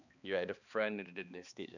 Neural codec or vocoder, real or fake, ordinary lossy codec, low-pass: codec, 16 kHz, 2 kbps, X-Codec, HuBERT features, trained on balanced general audio; fake; none; 7.2 kHz